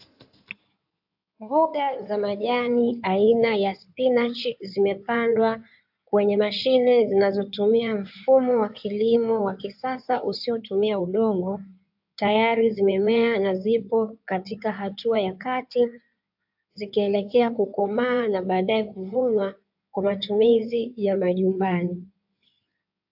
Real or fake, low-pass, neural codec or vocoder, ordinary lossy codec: fake; 5.4 kHz; codec, 16 kHz in and 24 kHz out, 2.2 kbps, FireRedTTS-2 codec; AAC, 48 kbps